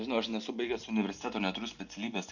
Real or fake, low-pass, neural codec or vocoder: real; 7.2 kHz; none